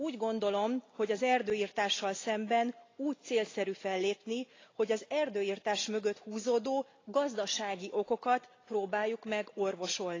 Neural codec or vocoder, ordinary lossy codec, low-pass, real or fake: none; AAC, 32 kbps; 7.2 kHz; real